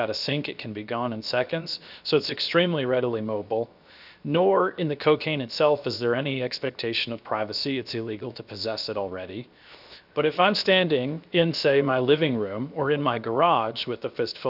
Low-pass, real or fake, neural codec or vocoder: 5.4 kHz; fake; codec, 16 kHz, 0.7 kbps, FocalCodec